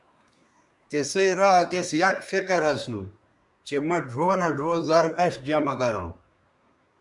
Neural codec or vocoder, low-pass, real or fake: codec, 24 kHz, 1 kbps, SNAC; 10.8 kHz; fake